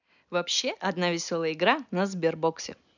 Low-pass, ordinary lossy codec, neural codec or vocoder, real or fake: 7.2 kHz; none; none; real